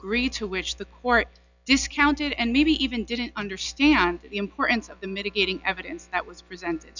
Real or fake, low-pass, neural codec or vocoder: real; 7.2 kHz; none